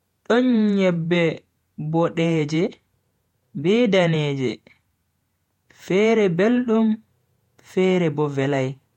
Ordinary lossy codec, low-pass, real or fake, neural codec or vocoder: MP3, 64 kbps; 19.8 kHz; fake; vocoder, 48 kHz, 128 mel bands, Vocos